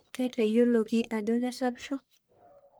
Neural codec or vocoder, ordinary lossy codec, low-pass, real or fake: codec, 44.1 kHz, 1.7 kbps, Pupu-Codec; none; none; fake